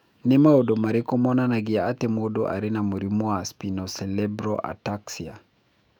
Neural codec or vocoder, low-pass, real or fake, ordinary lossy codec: autoencoder, 48 kHz, 128 numbers a frame, DAC-VAE, trained on Japanese speech; 19.8 kHz; fake; none